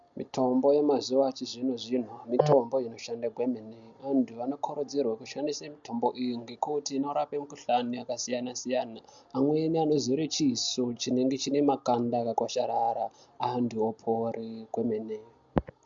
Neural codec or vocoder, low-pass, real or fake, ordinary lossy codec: none; 7.2 kHz; real; MP3, 64 kbps